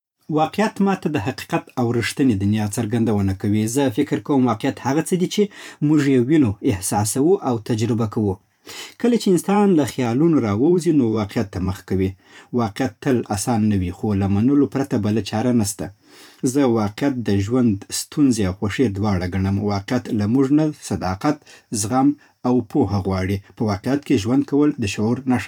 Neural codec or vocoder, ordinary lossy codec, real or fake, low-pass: vocoder, 44.1 kHz, 128 mel bands every 512 samples, BigVGAN v2; none; fake; 19.8 kHz